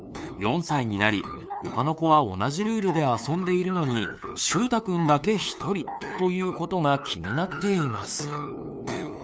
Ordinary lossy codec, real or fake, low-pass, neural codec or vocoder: none; fake; none; codec, 16 kHz, 2 kbps, FunCodec, trained on LibriTTS, 25 frames a second